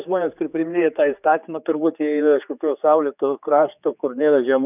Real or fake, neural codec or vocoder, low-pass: fake; codec, 16 kHz, 4 kbps, X-Codec, HuBERT features, trained on general audio; 3.6 kHz